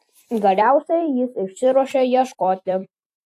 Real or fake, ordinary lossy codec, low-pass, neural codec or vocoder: fake; AAC, 64 kbps; 14.4 kHz; vocoder, 44.1 kHz, 128 mel bands every 256 samples, BigVGAN v2